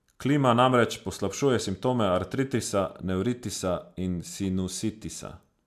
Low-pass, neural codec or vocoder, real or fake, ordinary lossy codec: 14.4 kHz; none; real; MP3, 96 kbps